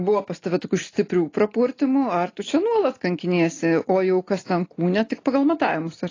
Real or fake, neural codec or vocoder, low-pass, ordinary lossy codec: real; none; 7.2 kHz; AAC, 32 kbps